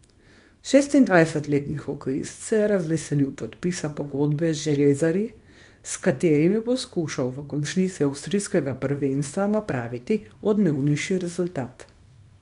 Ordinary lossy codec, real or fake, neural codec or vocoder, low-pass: MP3, 64 kbps; fake; codec, 24 kHz, 0.9 kbps, WavTokenizer, small release; 10.8 kHz